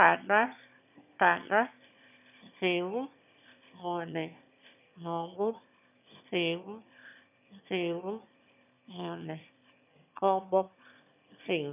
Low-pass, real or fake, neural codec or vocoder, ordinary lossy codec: 3.6 kHz; fake; autoencoder, 22.05 kHz, a latent of 192 numbers a frame, VITS, trained on one speaker; none